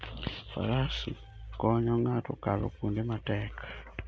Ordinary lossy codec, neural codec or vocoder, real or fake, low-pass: none; none; real; none